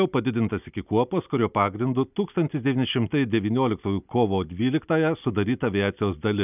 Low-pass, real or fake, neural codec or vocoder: 3.6 kHz; real; none